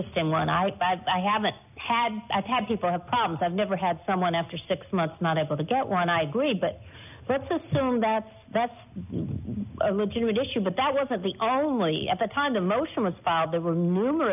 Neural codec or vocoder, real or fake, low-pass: none; real; 3.6 kHz